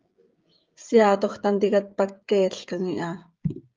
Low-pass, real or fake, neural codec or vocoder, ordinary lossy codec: 7.2 kHz; fake; codec, 16 kHz, 16 kbps, FreqCodec, smaller model; Opus, 24 kbps